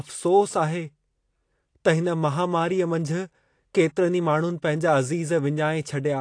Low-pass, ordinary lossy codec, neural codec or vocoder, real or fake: 9.9 kHz; AAC, 48 kbps; none; real